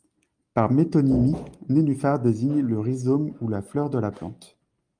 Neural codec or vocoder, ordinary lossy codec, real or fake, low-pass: none; Opus, 32 kbps; real; 9.9 kHz